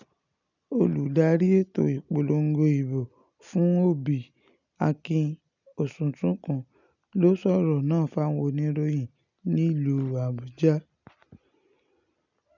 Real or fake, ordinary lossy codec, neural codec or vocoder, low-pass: real; none; none; 7.2 kHz